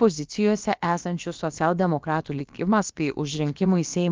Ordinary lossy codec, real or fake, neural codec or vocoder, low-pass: Opus, 24 kbps; fake; codec, 16 kHz, about 1 kbps, DyCAST, with the encoder's durations; 7.2 kHz